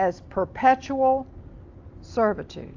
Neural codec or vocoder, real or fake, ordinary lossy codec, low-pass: none; real; AAC, 48 kbps; 7.2 kHz